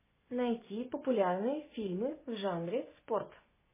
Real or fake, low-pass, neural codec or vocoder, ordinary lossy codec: fake; 3.6 kHz; vocoder, 24 kHz, 100 mel bands, Vocos; MP3, 16 kbps